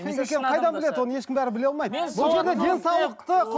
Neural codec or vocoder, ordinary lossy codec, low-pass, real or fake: none; none; none; real